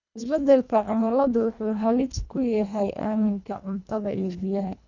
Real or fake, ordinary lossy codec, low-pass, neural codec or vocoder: fake; none; 7.2 kHz; codec, 24 kHz, 1.5 kbps, HILCodec